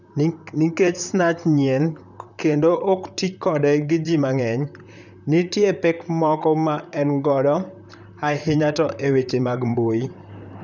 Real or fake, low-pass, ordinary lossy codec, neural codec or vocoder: fake; 7.2 kHz; none; vocoder, 44.1 kHz, 128 mel bands, Pupu-Vocoder